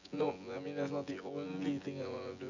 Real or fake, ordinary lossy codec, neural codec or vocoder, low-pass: fake; none; vocoder, 24 kHz, 100 mel bands, Vocos; 7.2 kHz